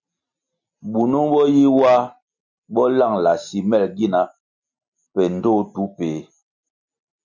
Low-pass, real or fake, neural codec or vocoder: 7.2 kHz; real; none